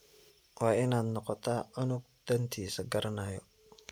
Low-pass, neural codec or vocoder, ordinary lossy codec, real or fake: none; none; none; real